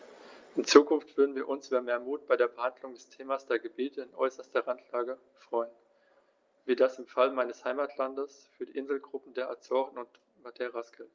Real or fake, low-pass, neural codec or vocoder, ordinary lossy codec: real; 7.2 kHz; none; Opus, 24 kbps